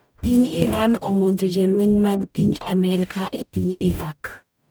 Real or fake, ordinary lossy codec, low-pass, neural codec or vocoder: fake; none; none; codec, 44.1 kHz, 0.9 kbps, DAC